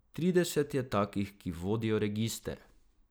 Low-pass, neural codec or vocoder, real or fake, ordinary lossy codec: none; none; real; none